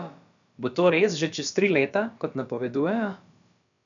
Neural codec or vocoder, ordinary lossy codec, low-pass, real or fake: codec, 16 kHz, about 1 kbps, DyCAST, with the encoder's durations; none; 7.2 kHz; fake